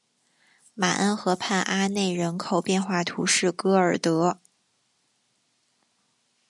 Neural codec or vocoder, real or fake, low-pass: none; real; 10.8 kHz